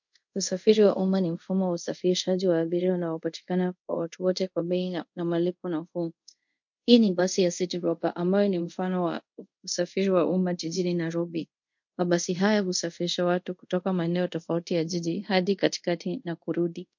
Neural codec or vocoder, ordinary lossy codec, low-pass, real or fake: codec, 24 kHz, 0.5 kbps, DualCodec; MP3, 48 kbps; 7.2 kHz; fake